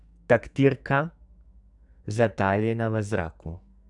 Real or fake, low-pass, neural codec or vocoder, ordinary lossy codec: fake; 10.8 kHz; codec, 44.1 kHz, 2.6 kbps, SNAC; none